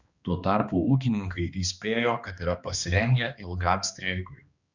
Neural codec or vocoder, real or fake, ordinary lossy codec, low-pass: codec, 16 kHz, 2 kbps, X-Codec, HuBERT features, trained on balanced general audio; fake; Opus, 64 kbps; 7.2 kHz